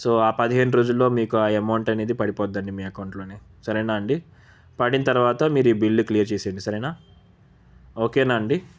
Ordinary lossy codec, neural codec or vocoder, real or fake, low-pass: none; none; real; none